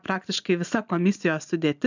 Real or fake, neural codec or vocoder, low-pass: real; none; 7.2 kHz